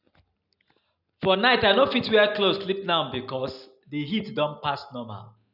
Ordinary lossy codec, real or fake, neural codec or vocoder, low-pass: none; real; none; 5.4 kHz